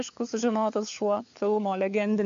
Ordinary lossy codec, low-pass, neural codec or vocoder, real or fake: AAC, 64 kbps; 7.2 kHz; codec, 16 kHz, 4 kbps, FunCodec, trained on LibriTTS, 50 frames a second; fake